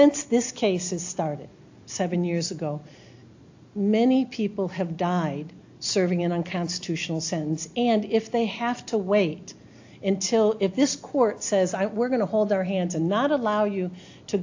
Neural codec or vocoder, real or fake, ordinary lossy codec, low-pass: none; real; AAC, 48 kbps; 7.2 kHz